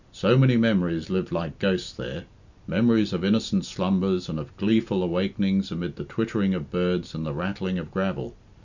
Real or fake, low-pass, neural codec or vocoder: real; 7.2 kHz; none